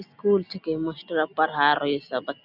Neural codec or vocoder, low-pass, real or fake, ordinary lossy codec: none; 5.4 kHz; real; none